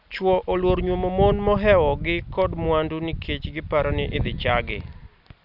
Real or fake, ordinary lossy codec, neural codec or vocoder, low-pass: real; none; none; 5.4 kHz